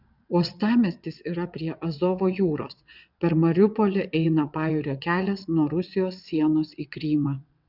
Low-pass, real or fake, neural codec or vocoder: 5.4 kHz; fake; vocoder, 22.05 kHz, 80 mel bands, WaveNeXt